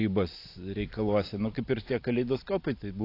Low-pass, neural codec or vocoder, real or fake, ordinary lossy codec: 5.4 kHz; vocoder, 44.1 kHz, 128 mel bands every 256 samples, BigVGAN v2; fake; AAC, 32 kbps